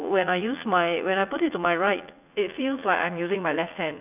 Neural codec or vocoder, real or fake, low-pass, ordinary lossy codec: vocoder, 44.1 kHz, 80 mel bands, Vocos; fake; 3.6 kHz; none